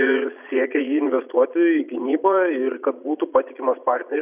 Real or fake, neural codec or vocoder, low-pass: fake; vocoder, 22.05 kHz, 80 mel bands, Vocos; 3.6 kHz